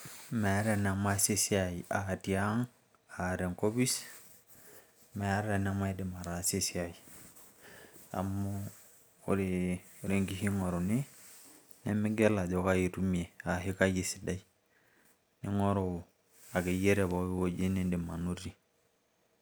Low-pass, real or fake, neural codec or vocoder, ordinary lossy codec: none; real; none; none